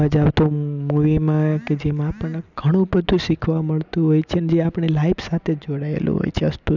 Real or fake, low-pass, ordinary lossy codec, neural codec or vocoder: real; 7.2 kHz; none; none